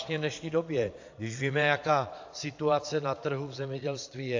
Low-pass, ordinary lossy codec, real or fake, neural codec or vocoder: 7.2 kHz; AAC, 48 kbps; fake; vocoder, 22.05 kHz, 80 mel bands, Vocos